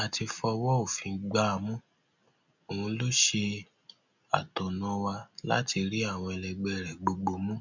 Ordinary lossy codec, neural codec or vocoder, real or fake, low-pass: none; none; real; 7.2 kHz